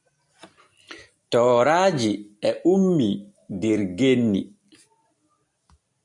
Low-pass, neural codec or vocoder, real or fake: 10.8 kHz; none; real